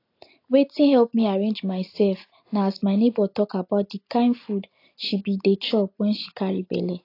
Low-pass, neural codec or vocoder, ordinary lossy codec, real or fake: 5.4 kHz; none; AAC, 32 kbps; real